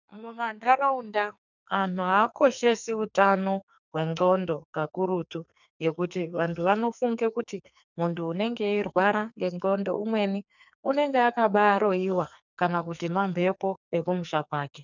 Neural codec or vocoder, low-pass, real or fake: codec, 44.1 kHz, 2.6 kbps, SNAC; 7.2 kHz; fake